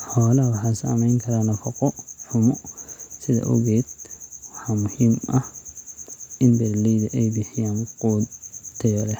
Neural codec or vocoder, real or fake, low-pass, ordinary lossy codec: none; real; 19.8 kHz; none